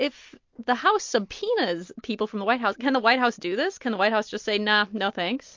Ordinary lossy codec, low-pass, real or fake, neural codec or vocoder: MP3, 48 kbps; 7.2 kHz; real; none